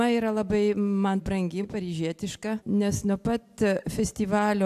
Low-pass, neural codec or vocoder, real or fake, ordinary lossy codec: 14.4 kHz; none; real; AAC, 96 kbps